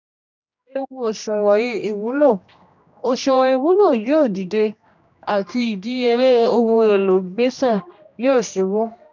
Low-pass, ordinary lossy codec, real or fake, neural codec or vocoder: 7.2 kHz; none; fake; codec, 16 kHz, 1 kbps, X-Codec, HuBERT features, trained on general audio